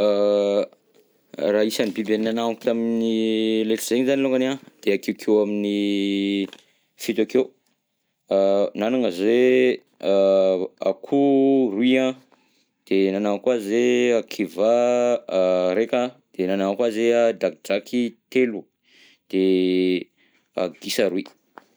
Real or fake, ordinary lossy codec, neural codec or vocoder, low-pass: real; none; none; none